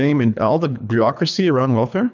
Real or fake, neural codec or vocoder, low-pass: fake; codec, 24 kHz, 3 kbps, HILCodec; 7.2 kHz